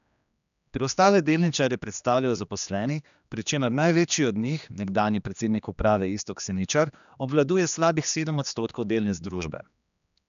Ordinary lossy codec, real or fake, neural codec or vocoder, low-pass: none; fake; codec, 16 kHz, 2 kbps, X-Codec, HuBERT features, trained on general audio; 7.2 kHz